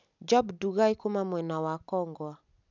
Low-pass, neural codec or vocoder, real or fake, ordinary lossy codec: 7.2 kHz; none; real; none